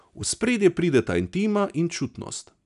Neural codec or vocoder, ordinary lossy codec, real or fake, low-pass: none; none; real; 10.8 kHz